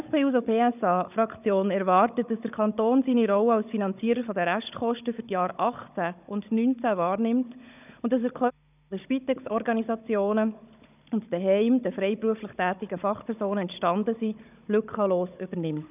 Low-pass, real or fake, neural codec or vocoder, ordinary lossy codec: 3.6 kHz; fake; codec, 16 kHz, 16 kbps, FunCodec, trained on Chinese and English, 50 frames a second; none